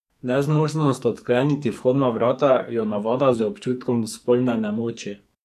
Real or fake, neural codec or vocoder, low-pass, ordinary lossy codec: fake; codec, 44.1 kHz, 2.6 kbps, SNAC; 14.4 kHz; none